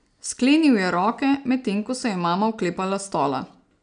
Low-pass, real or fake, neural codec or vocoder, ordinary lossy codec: 9.9 kHz; real; none; none